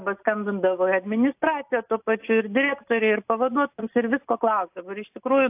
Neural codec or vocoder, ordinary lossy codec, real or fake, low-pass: none; AAC, 32 kbps; real; 3.6 kHz